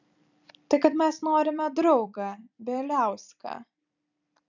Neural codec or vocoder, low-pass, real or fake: vocoder, 44.1 kHz, 128 mel bands every 256 samples, BigVGAN v2; 7.2 kHz; fake